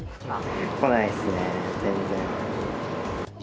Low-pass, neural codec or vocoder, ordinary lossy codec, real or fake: none; none; none; real